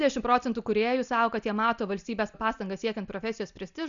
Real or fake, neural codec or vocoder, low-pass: real; none; 7.2 kHz